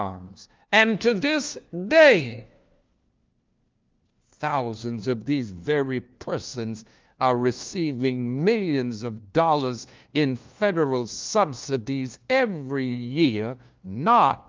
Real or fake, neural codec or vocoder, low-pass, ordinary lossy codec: fake; codec, 16 kHz, 1 kbps, FunCodec, trained on LibriTTS, 50 frames a second; 7.2 kHz; Opus, 24 kbps